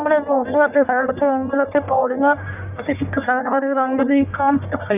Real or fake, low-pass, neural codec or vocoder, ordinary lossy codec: fake; 3.6 kHz; codec, 44.1 kHz, 1.7 kbps, Pupu-Codec; none